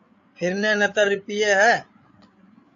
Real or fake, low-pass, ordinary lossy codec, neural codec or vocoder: fake; 7.2 kHz; AAC, 48 kbps; codec, 16 kHz, 8 kbps, FreqCodec, larger model